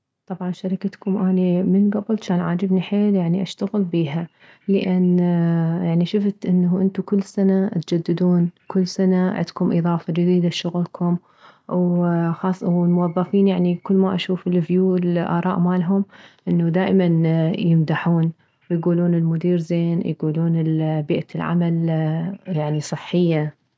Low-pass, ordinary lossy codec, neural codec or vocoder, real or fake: none; none; none; real